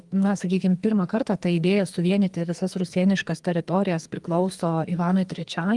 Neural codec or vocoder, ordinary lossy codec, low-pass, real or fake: codec, 44.1 kHz, 2.6 kbps, SNAC; Opus, 32 kbps; 10.8 kHz; fake